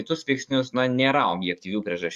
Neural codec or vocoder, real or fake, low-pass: codec, 44.1 kHz, 7.8 kbps, DAC; fake; 14.4 kHz